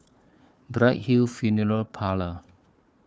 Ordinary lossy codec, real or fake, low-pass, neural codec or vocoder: none; fake; none; codec, 16 kHz, 4 kbps, FunCodec, trained on Chinese and English, 50 frames a second